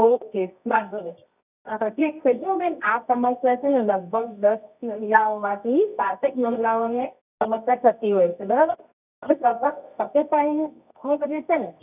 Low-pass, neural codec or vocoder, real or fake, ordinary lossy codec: 3.6 kHz; codec, 24 kHz, 0.9 kbps, WavTokenizer, medium music audio release; fake; none